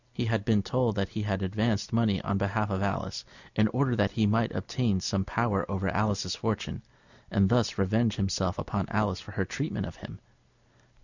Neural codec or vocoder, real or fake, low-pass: none; real; 7.2 kHz